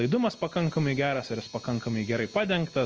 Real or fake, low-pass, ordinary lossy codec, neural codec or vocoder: real; 7.2 kHz; Opus, 24 kbps; none